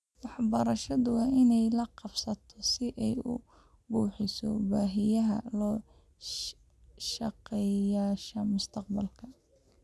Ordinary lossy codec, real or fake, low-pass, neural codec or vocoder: none; real; none; none